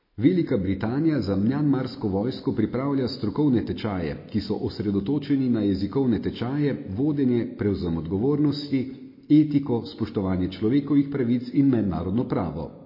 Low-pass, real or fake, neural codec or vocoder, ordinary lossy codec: 5.4 kHz; real; none; MP3, 24 kbps